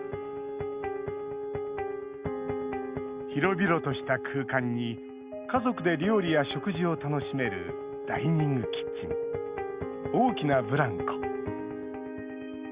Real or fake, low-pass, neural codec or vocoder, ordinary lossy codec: real; 3.6 kHz; none; Opus, 64 kbps